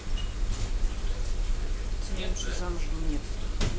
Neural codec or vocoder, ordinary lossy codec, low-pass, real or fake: none; none; none; real